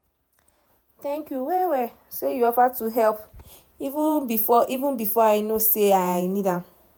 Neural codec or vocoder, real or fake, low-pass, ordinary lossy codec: vocoder, 48 kHz, 128 mel bands, Vocos; fake; none; none